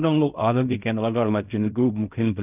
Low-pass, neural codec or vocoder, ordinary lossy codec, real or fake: 3.6 kHz; codec, 16 kHz in and 24 kHz out, 0.4 kbps, LongCat-Audio-Codec, fine tuned four codebook decoder; none; fake